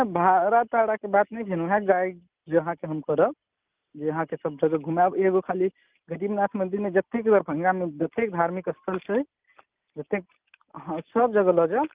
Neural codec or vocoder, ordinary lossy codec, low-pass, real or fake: none; Opus, 32 kbps; 3.6 kHz; real